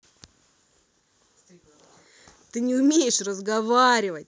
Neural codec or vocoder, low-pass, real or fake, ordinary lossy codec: none; none; real; none